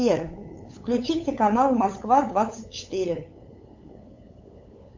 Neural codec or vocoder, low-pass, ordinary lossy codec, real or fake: codec, 16 kHz, 8 kbps, FunCodec, trained on LibriTTS, 25 frames a second; 7.2 kHz; MP3, 64 kbps; fake